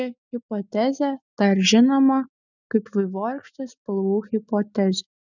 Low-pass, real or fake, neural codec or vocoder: 7.2 kHz; real; none